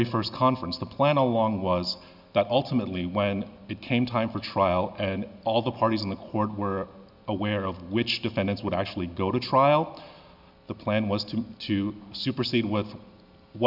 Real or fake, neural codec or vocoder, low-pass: real; none; 5.4 kHz